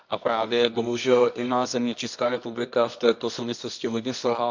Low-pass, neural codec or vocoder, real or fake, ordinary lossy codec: 7.2 kHz; codec, 24 kHz, 0.9 kbps, WavTokenizer, medium music audio release; fake; MP3, 64 kbps